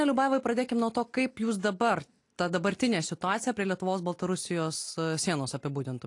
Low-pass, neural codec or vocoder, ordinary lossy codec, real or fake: 10.8 kHz; none; AAC, 48 kbps; real